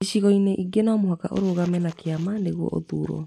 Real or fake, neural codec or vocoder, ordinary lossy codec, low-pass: real; none; none; 14.4 kHz